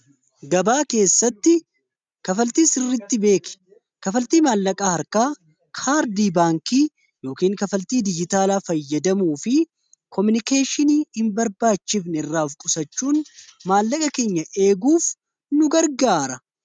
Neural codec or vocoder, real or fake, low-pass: none; real; 9.9 kHz